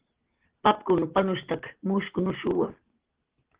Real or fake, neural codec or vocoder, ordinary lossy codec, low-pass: real; none; Opus, 16 kbps; 3.6 kHz